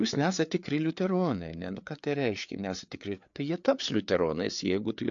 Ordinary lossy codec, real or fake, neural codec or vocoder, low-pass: AAC, 64 kbps; fake; codec, 16 kHz, 4 kbps, FreqCodec, larger model; 7.2 kHz